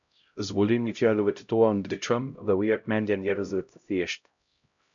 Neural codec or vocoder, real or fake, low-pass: codec, 16 kHz, 0.5 kbps, X-Codec, HuBERT features, trained on LibriSpeech; fake; 7.2 kHz